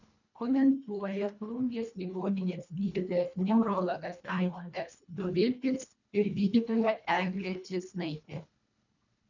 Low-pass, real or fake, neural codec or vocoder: 7.2 kHz; fake; codec, 24 kHz, 1.5 kbps, HILCodec